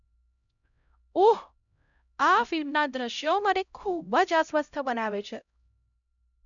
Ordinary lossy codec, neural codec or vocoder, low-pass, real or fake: none; codec, 16 kHz, 0.5 kbps, X-Codec, HuBERT features, trained on LibriSpeech; 7.2 kHz; fake